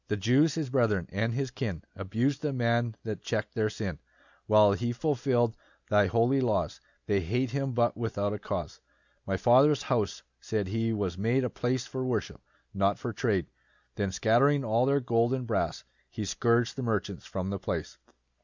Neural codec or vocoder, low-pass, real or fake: none; 7.2 kHz; real